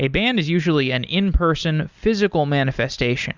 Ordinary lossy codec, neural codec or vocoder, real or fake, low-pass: Opus, 64 kbps; none; real; 7.2 kHz